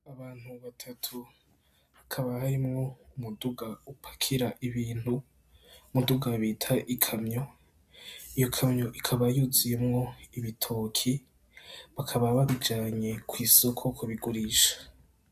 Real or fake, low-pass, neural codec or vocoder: real; 14.4 kHz; none